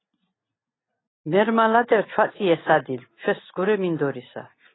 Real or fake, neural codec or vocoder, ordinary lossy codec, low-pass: real; none; AAC, 16 kbps; 7.2 kHz